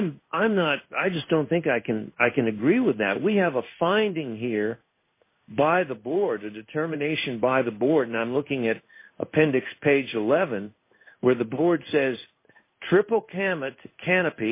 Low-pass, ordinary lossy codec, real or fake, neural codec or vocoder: 3.6 kHz; MP3, 24 kbps; fake; codec, 16 kHz in and 24 kHz out, 1 kbps, XY-Tokenizer